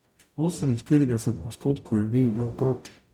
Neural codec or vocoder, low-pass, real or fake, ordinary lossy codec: codec, 44.1 kHz, 0.9 kbps, DAC; 19.8 kHz; fake; none